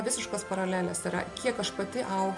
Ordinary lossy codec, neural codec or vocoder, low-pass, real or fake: MP3, 64 kbps; none; 10.8 kHz; real